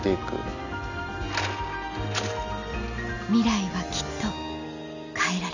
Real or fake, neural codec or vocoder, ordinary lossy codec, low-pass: real; none; none; 7.2 kHz